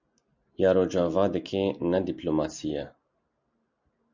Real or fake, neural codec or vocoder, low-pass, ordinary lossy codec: real; none; 7.2 kHz; MP3, 64 kbps